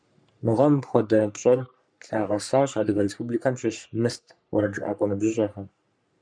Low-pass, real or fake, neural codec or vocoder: 9.9 kHz; fake; codec, 44.1 kHz, 3.4 kbps, Pupu-Codec